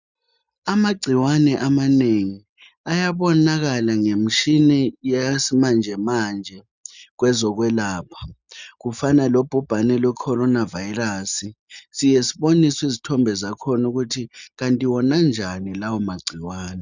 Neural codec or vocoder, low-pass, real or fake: none; 7.2 kHz; real